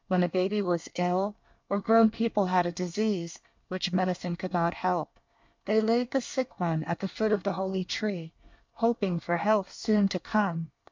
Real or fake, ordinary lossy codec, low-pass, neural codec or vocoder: fake; MP3, 64 kbps; 7.2 kHz; codec, 24 kHz, 1 kbps, SNAC